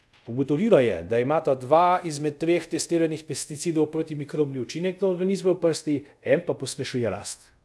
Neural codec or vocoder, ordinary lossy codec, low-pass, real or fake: codec, 24 kHz, 0.5 kbps, DualCodec; none; none; fake